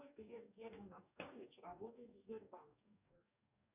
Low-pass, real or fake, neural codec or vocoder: 3.6 kHz; fake; codec, 44.1 kHz, 2.6 kbps, DAC